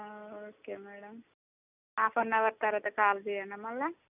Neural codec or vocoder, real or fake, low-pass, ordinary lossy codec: none; real; 3.6 kHz; none